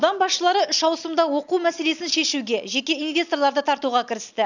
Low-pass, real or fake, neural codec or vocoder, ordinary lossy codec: 7.2 kHz; real; none; none